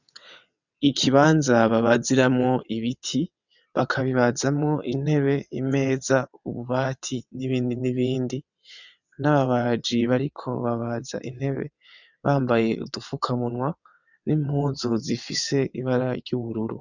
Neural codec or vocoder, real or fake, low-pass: vocoder, 22.05 kHz, 80 mel bands, WaveNeXt; fake; 7.2 kHz